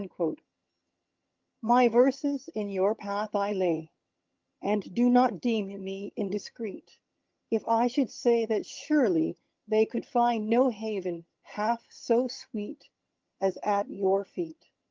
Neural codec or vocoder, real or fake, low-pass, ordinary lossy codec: vocoder, 22.05 kHz, 80 mel bands, HiFi-GAN; fake; 7.2 kHz; Opus, 32 kbps